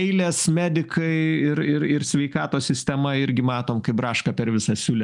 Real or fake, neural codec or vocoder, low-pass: real; none; 10.8 kHz